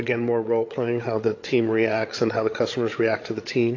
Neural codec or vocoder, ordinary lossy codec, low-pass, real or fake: codec, 16 kHz, 16 kbps, FreqCodec, larger model; AAC, 32 kbps; 7.2 kHz; fake